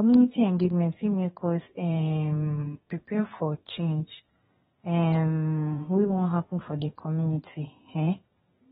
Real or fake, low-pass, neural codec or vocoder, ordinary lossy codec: fake; 14.4 kHz; codec, 32 kHz, 1.9 kbps, SNAC; AAC, 16 kbps